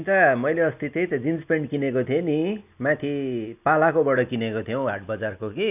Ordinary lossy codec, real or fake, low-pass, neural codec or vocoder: none; real; 3.6 kHz; none